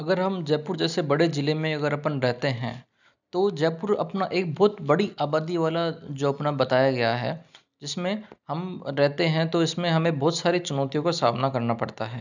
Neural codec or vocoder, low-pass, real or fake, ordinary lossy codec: none; 7.2 kHz; real; none